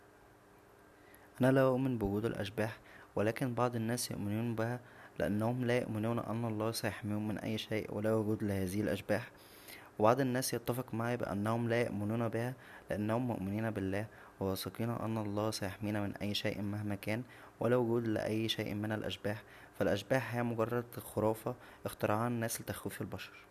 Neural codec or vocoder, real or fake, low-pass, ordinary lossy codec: none; real; 14.4 kHz; none